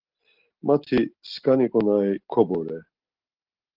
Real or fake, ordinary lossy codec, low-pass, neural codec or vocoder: real; Opus, 16 kbps; 5.4 kHz; none